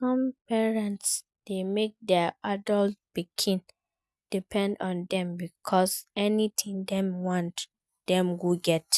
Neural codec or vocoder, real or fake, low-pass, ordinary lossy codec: none; real; none; none